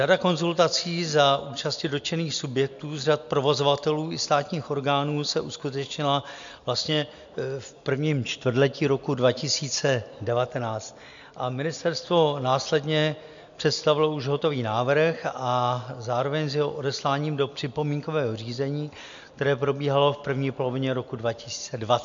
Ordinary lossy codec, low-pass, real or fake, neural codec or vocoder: MP3, 64 kbps; 7.2 kHz; real; none